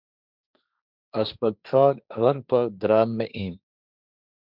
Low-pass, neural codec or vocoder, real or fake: 5.4 kHz; codec, 16 kHz, 1.1 kbps, Voila-Tokenizer; fake